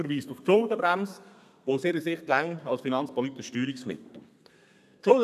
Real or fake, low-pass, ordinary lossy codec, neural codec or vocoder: fake; 14.4 kHz; none; codec, 32 kHz, 1.9 kbps, SNAC